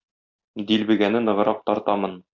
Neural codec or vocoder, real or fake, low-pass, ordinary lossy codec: none; real; 7.2 kHz; AAC, 48 kbps